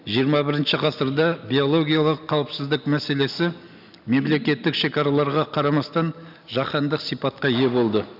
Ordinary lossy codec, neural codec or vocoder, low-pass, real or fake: none; none; 5.4 kHz; real